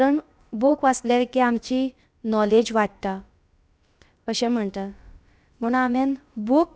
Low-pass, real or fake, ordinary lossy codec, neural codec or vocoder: none; fake; none; codec, 16 kHz, about 1 kbps, DyCAST, with the encoder's durations